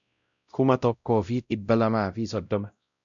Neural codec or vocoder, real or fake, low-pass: codec, 16 kHz, 0.5 kbps, X-Codec, WavLM features, trained on Multilingual LibriSpeech; fake; 7.2 kHz